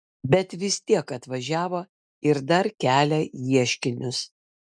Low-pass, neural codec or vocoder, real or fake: 9.9 kHz; none; real